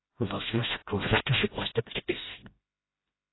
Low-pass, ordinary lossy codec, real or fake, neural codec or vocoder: 7.2 kHz; AAC, 16 kbps; fake; codec, 16 kHz, 0.5 kbps, FreqCodec, smaller model